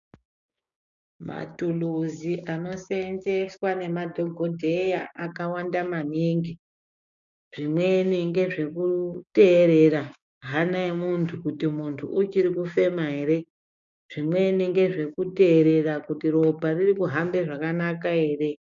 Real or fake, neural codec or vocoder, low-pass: fake; codec, 16 kHz, 6 kbps, DAC; 7.2 kHz